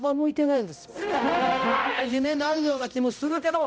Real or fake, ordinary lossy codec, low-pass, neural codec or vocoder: fake; none; none; codec, 16 kHz, 0.5 kbps, X-Codec, HuBERT features, trained on balanced general audio